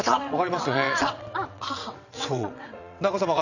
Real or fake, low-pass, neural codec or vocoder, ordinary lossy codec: fake; 7.2 kHz; vocoder, 22.05 kHz, 80 mel bands, WaveNeXt; none